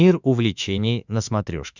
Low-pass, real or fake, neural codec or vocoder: 7.2 kHz; fake; autoencoder, 48 kHz, 32 numbers a frame, DAC-VAE, trained on Japanese speech